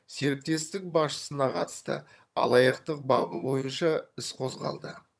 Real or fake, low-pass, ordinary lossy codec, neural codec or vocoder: fake; none; none; vocoder, 22.05 kHz, 80 mel bands, HiFi-GAN